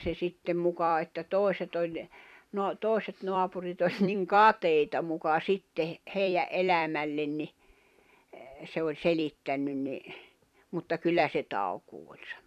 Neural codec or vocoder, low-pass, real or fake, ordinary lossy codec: vocoder, 44.1 kHz, 128 mel bands every 256 samples, BigVGAN v2; 14.4 kHz; fake; none